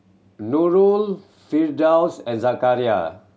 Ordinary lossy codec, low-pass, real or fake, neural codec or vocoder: none; none; real; none